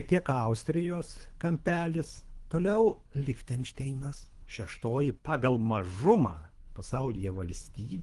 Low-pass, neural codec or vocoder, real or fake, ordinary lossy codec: 10.8 kHz; codec, 24 kHz, 3 kbps, HILCodec; fake; Opus, 24 kbps